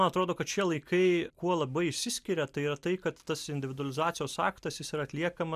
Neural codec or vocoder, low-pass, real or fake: none; 14.4 kHz; real